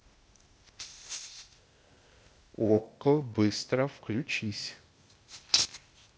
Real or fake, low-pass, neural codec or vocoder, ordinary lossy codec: fake; none; codec, 16 kHz, 0.8 kbps, ZipCodec; none